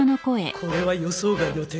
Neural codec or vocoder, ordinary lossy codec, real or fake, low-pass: none; none; real; none